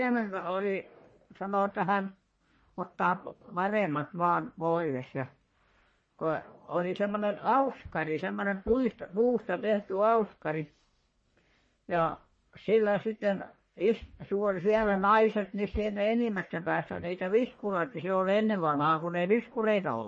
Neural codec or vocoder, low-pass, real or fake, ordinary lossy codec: codec, 44.1 kHz, 1.7 kbps, Pupu-Codec; 9.9 kHz; fake; MP3, 32 kbps